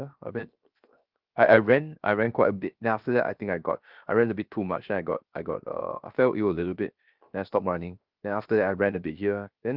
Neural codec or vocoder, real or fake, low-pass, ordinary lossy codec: codec, 16 kHz, 0.7 kbps, FocalCodec; fake; 5.4 kHz; Opus, 32 kbps